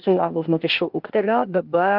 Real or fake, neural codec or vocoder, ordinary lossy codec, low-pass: fake; codec, 16 kHz, 0.8 kbps, ZipCodec; Opus, 32 kbps; 5.4 kHz